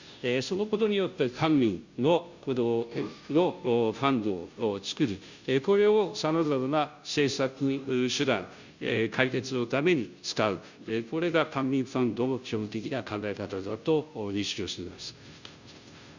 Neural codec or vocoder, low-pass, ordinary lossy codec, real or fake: codec, 16 kHz, 0.5 kbps, FunCodec, trained on Chinese and English, 25 frames a second; 7.2 kHz; Opus, 64 kbps; fake